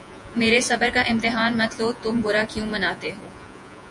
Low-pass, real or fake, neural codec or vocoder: 10.8 kHz; fake; vocoder, 48 kHz, 128 mel bands, Vocos